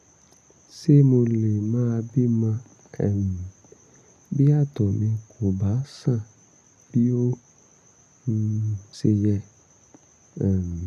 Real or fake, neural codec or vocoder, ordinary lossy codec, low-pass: real; none; none; 14.4 kHz